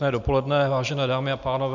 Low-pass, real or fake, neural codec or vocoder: 7.2 kHz; real; none